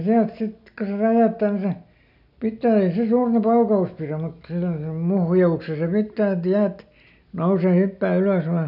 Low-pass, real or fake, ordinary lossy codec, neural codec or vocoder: 5.4 kHz; real; none; none